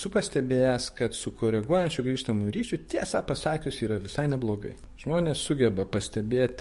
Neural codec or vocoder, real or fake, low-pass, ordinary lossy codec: codec, 44.1 kHz, 7.8 kbps, DAC; fake; 14.4 kHz; MP3, 48 kbps